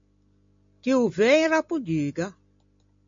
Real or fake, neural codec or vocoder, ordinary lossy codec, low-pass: real; none; MP3, 48 kbps; 7.2 kHz